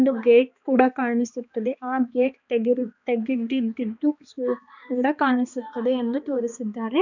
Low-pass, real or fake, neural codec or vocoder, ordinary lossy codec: 7.2 kHz; fake; codec, 16 kHz, 2 kbps, X-Codec, HuBERT features, trained on balanced general audio; none